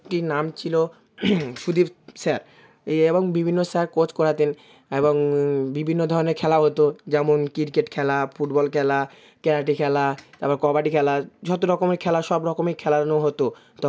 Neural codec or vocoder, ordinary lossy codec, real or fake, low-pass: none; none; real; none